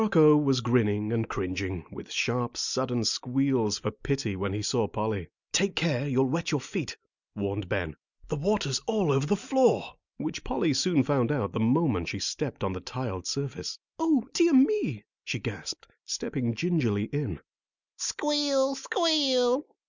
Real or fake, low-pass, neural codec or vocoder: real; 7.2 kHz; none